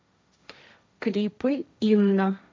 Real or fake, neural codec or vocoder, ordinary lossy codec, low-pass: fake; codec, 16 kHz, 1.1 kbps, Voila-Tokenizer; none; none